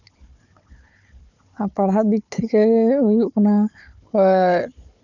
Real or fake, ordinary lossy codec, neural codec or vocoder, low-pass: fake; none; codec, 16 kHz, 4 kbps, FunCodec, trained on Chinese and English, 50 frames a second; 7.2 kHz